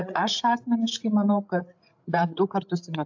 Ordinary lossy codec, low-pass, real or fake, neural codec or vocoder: AAC, 48 kbps; 7.2 kHz; fake; codec, 16 kHz, 8 kbps, FreqCodec, larger model